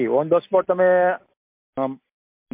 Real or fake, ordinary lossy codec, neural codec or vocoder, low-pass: real; MP3, 32 kbps; none; 3.6 kHz